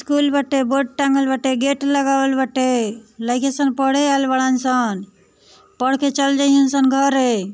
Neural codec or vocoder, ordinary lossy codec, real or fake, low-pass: none; none; real; none